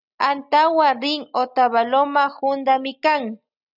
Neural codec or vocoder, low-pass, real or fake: none; 5.4 kHz; real